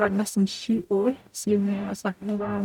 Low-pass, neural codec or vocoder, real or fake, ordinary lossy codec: 19.8 kHz; codec, 44.1 kHz, 0.9 kbps, DAC; fake; none